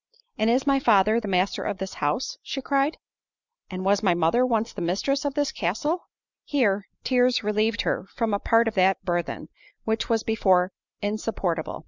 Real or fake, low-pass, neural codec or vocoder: real; 7.2 kHz; none